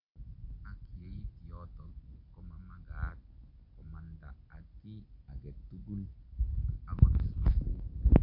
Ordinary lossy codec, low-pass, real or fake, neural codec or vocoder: MP3, 48 kbps; 5.4 kHz; real; none